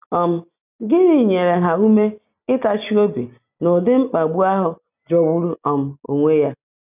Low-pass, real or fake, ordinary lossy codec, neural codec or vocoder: 3.6 kHz; real; none; none